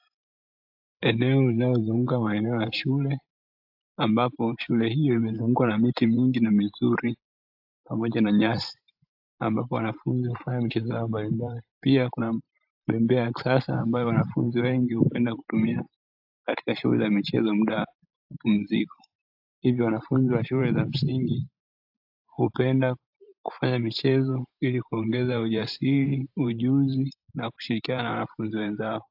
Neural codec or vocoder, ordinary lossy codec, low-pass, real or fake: vocoder, 44.1 kHz, 128 mel bands every 512 samples, BigVGAN v2; AAC, 48 kbps; 5.4 kHz; fake